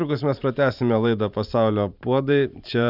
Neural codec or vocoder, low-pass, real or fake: vocoder, 44.1 kHz, 128 mel bands every 512 samples, BigVGAN v2; 5.4 kHz; fake